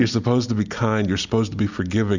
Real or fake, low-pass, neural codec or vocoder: real; 7.2 kHz; none